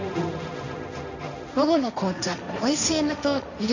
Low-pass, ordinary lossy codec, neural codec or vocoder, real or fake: 7.2 kHz; none; codec, 16 kHz, 1.1 kbps, Voila-Tokenizer; fake